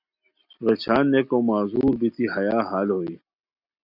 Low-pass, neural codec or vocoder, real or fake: 5.4 kHz; none; real